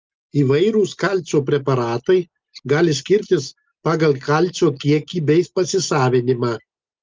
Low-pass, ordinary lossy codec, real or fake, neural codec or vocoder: 7.2 kHz; Opus, 32 kbps; real; none